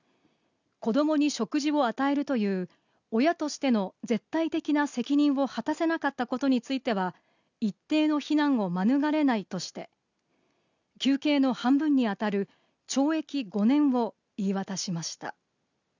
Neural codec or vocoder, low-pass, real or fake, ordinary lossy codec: none; 7.2 kHz; real; none